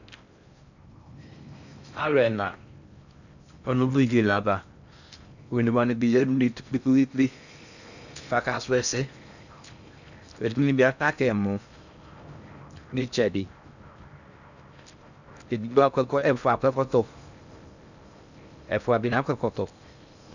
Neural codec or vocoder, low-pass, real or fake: codec, 16 kHz in and 24 kHz out, 0.6 kbps, FocalCodec, streaming, 4096 codes; 7.2 kHz; fake